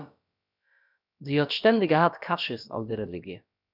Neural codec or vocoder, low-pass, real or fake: codec, 16 kHz, about 1 kbps, DyCAST, with the encoder's durations; 5.4 kHz; fake